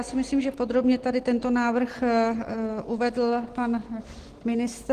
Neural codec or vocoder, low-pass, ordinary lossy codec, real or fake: none; 14.4 kHz; Opus, 16 kbps; real